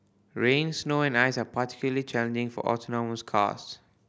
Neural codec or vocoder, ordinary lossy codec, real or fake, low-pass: none; none; real; none